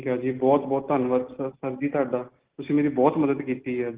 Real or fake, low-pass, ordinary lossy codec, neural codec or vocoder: real; 3.6 kHz; Opus, 16 kbps; none